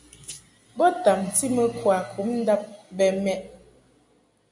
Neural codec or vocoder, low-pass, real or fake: none; 10.8 kHz; real